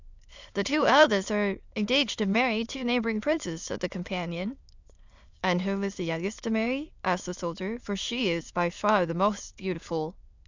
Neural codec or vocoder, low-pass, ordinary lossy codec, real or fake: autoencoder, 22.05 kHz, a latent of 192 numbers a frame, VITS, trained on many speakers; 7.2 kHz; Opus, 64 kbps; fake